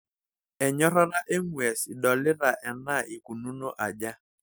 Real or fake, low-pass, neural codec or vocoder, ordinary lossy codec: real; none; none; none